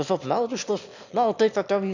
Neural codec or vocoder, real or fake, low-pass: autoencoder, 22.05 kHz, a latent of 192 numbers a frame, VITS, trained on one speaker; fake; 7.2 kHz